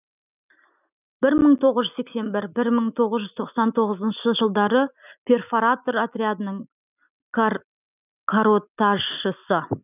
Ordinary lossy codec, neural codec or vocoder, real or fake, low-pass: none; none; real; 3.6 kHz